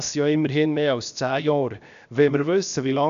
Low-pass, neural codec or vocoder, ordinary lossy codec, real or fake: 7.2 kHz; codec, 16 kHz, 0.7 kbps, FocalCodec; none; fake